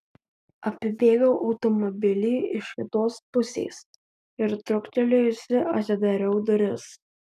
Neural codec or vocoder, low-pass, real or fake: none; 14.4 kHz; real